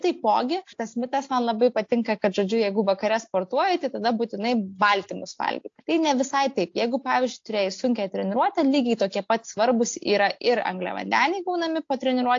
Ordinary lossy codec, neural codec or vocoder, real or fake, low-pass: AAC, 48 kbps; none; real; 7.2 kHz